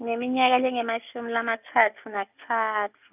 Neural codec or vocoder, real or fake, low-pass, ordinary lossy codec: none; real; 3.6 kHz; none